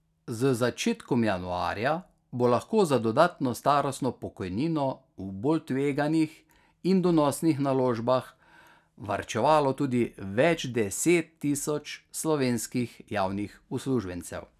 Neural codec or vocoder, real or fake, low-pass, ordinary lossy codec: none; real; 14.4 kHz; none